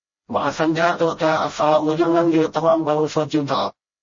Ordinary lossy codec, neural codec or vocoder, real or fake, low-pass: MP3, 32 kbps; codec, 16 kHz, 0.5 kbps, FreqCodec, smaller model; fake; 7.2 kHz